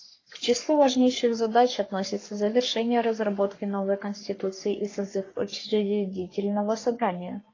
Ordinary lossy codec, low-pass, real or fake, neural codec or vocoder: AAC, 32 kbps; 7.2 kHz; fake; codec, 44.1 kHz, 3.4 kbps, Pupu-Codec